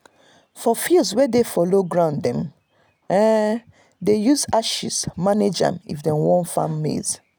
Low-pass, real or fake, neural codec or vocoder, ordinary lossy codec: none; real; none; none